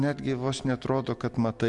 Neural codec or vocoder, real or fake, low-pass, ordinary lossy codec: none; real; 10.8 kHz; MP3, 64 kbps